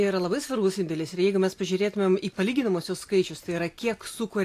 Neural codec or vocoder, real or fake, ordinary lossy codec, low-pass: none; real; AAC, 64 kbps; 14.4 kHz